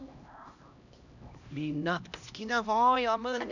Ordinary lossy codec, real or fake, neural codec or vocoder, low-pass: none; fake; codec, 16 kHz, 1 kbps, X-Codec, HuBERT features, trained on LibriSpeech; 7.2 kHz